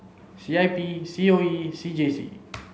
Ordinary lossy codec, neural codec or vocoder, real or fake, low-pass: none; none; real; none